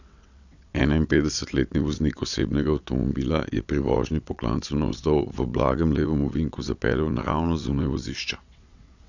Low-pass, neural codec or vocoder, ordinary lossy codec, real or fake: 7.2 kHz; vocoder, 22.05 kHz, 80 mel bands, WaveNeXt; none; fake